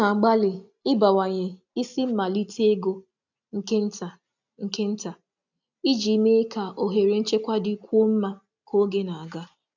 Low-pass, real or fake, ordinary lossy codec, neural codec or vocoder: 7.2 kHz; real; none; none